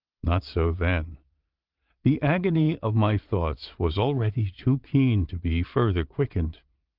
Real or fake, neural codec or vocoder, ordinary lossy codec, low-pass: fake; vocoder, 22.05 kHz, 80 mel bands, Vocos; Opus, 24 kbps; 5.4 kHz